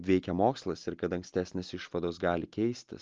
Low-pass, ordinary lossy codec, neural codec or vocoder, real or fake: 7.2 kHz; Opus, 24 kbps; none; real